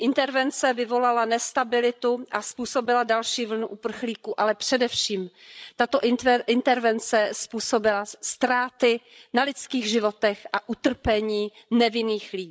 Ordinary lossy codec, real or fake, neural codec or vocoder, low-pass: none; fake; codec, 16 kHz, 16 kbps, FreqCodec, larger model; none